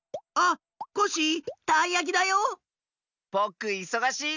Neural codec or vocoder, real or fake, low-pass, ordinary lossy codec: none; real; 7.2 kHz; none